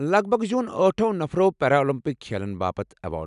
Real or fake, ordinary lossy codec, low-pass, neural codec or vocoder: real; none; 10.8 kHz; none